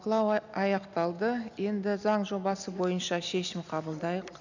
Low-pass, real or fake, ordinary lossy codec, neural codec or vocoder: 7.2 kHz; real; none; none